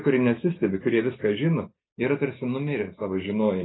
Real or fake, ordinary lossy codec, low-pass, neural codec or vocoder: real; AAC, 16 kbps; 7.2 kHz; none